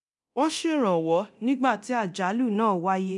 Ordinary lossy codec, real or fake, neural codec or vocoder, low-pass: none; fake; codec, 24 kHz, 0.9 kbps, DualCodec; 10.8 kHz